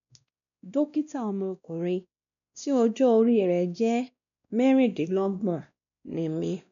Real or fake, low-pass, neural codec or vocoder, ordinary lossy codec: fake; 7.2 kHz; codec, 16 kHz, 1 kbps, X-Codec, WavLM features, trained on Multilingual LibriSpeech; none